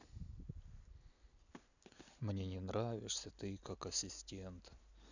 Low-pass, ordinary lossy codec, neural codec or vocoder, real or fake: 7.2 kHz; none; none; real